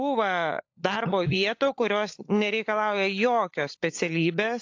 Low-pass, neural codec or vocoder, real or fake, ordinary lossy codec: 7.2 kHz; codec, 16 kHz, 16 kbps, FunCodec, trained on LibriTTS, 50 frames a second; fake; AAC, 48 kbps